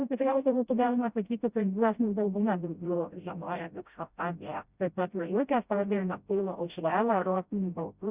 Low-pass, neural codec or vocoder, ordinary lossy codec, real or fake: 3.6 kHz; codec, 16 kHz, 0.5 kbps, FreqCodec, smaller model; Opus, 64 kbps; fake